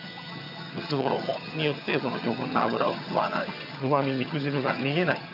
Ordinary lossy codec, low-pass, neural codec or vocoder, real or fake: none; 5.4 kHz; vocoder, 22.05 kHz, 80 mel bands, HiFi-GAN; fake